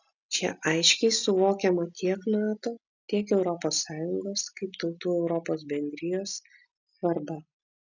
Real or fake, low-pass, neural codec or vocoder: real; 7.2 kHz; none